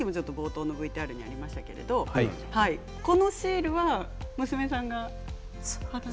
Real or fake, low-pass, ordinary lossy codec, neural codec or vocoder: real; none; none; none